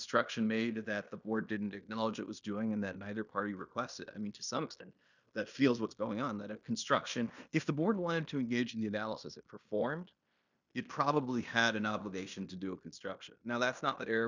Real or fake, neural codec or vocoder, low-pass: fake; codec, 16 kHz in and 24 kHz out, 0.9 kbps, LongCat-Audio-Codec, fine tuned four codebook decoder; 7.2 kHz